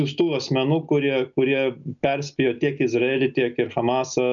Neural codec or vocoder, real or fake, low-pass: none; real; 7.2 kHz